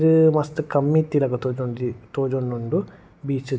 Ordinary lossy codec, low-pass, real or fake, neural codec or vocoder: none; none; real; none